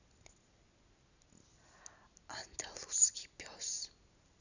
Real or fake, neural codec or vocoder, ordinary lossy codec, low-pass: real; none; none; 7.2 kHz